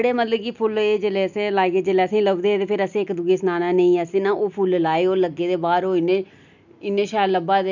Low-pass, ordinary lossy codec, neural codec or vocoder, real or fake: 7.2 kHz; none; none; real